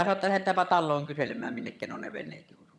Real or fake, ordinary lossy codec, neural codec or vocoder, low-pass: fake; none; vocoder, 22.05 kHz, 80 mel bands, HiFi-GAN; none